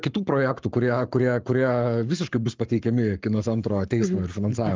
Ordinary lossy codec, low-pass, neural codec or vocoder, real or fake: Opus, 32 kbps; 7.2 kHz; codec, 44.1 kHz, 7.8 kbps, Pupu-Codec; fake